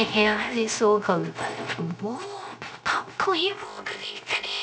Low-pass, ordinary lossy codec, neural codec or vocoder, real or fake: none; none; codec, 16 kHz, 0.3 kbps, FocalCodec; fake